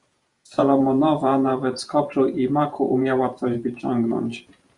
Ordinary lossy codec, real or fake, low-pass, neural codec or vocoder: Opus, 64 kbps; fake; 10.8 kHz; vocoder, 48 kHz, 128 mel bands, Vocos